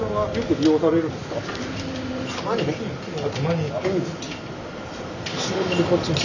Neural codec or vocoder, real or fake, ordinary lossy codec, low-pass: none; real; none; 7.2 kHz